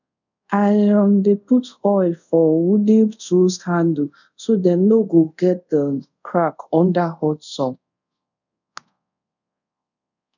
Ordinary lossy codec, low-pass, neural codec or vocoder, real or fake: none; 7.2 kHz; codec, 24 kHz, 0.5 kbps, DualCodec; fake